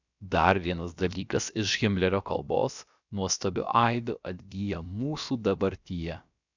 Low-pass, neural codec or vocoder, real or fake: 7.2 kHz; codec, 16 kHz, about 1 kbps, DyCAST, with the encoder's durations; fake